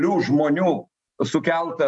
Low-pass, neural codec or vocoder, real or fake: 10.8 kHz; none; real